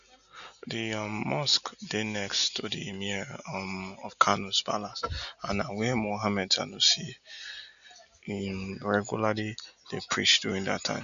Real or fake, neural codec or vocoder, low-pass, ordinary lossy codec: real; none; 7.2 kHz; none